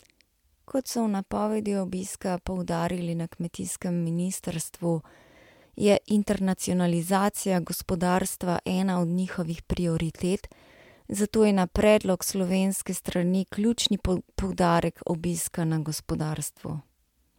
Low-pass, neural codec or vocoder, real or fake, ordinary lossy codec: 19.8 kHz; none; real; MP3, 96 kbps